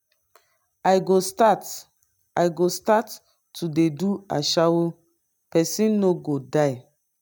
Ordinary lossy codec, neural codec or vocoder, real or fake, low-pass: none; none; real; none